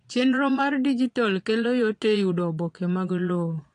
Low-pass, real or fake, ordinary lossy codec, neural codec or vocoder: 9.9 kHz; fake; AAC, 48 kbps; vocoder, 22.05 kHz, 80 mel bands, Vocos